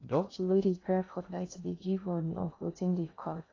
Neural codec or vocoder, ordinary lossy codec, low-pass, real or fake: codec, 16 kHz in and 24 kHz out, 0.6 kbps, FocalCodec, streaming, 2048 codes; AAC, 32 kbps; 7.2 kHz; fake